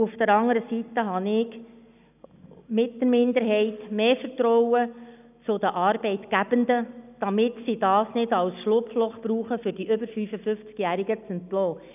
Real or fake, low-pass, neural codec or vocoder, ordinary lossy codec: real; 3.6 kHz; none; none